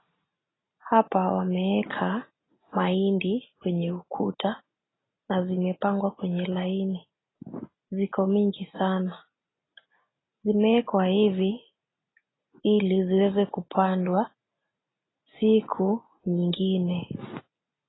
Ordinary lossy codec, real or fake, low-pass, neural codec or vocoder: AAC, 16 kbps; real; 7.2 kHz; none